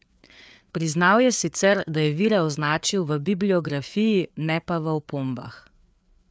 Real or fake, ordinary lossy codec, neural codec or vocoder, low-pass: fake; none; codec, 16 kHz, 4 kbps, FreqCodec, larger model; none